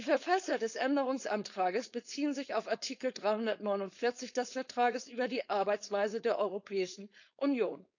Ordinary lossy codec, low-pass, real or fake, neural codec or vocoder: none; 7.2 kHz; fake; codec, 16 kHz, 4.8 kbps, FACodec